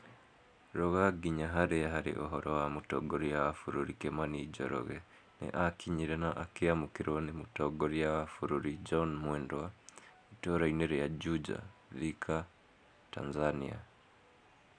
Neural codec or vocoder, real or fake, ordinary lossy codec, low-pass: none; real; none; 9.9 kHz